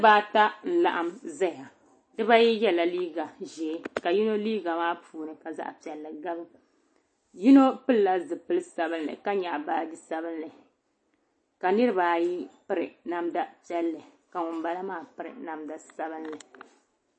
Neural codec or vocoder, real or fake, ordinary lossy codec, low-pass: none; real; MP3, 32 kbps; 9.9 kHz